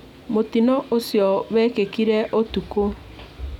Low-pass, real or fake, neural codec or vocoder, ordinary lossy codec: 19.8 kHz; real; none; none